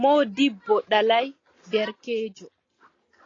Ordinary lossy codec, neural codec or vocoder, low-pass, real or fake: AAC, 32 kbps; none; 7.2 kHz; real